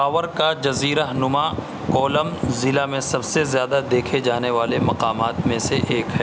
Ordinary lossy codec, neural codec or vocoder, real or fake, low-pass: none; none; real; none